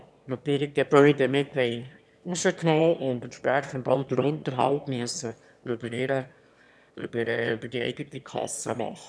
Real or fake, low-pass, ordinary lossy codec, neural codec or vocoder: fake; none; none; autoencoder, 22.05 kHz, a latent of 192 numbers a frame, VITS, trained on one speaker